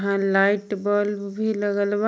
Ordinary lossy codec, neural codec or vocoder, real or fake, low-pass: none; none; real; none